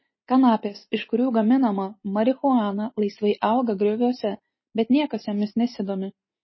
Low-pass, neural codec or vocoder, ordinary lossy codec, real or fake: 7.2 kHz; none; MP3, 24 kbps; real